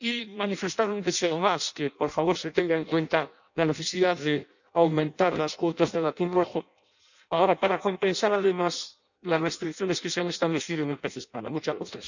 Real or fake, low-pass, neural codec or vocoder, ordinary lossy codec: fake; 7.2 kHz; codec, 16 kHz in and 24 kHz out, 0.6 kbps, FireRedTTS-2 codec; none